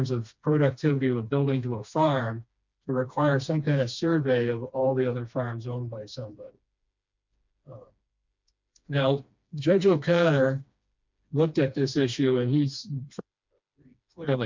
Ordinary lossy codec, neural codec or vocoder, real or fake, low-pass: MP3, 64 kbps; codec, 16 kHz, 2 kbps, FreqCodec, smaller model; fake; 7.2 kHz